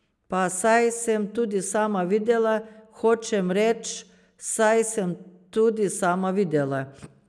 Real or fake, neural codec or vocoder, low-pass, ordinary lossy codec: real; none; none; none